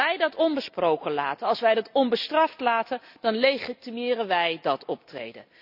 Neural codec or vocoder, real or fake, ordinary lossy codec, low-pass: none; real; none; 5.4 kHz